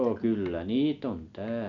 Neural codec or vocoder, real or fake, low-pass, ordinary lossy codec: none; real; 7.2 kHz; none